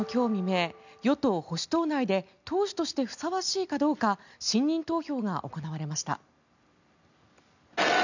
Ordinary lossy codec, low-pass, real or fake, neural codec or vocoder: none; 7.2 kHz; real; none